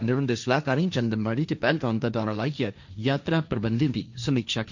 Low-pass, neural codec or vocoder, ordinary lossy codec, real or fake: 7.2 kHz; codec, 16 kHz, 1.1 kbps, Voila-Tokenizer; none; fake